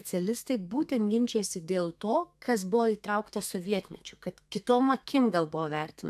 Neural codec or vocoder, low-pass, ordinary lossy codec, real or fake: codec, 32 kHz, 1.9 kbps, SNAC; 14.4 kHz; AAC, 96 kbps; fake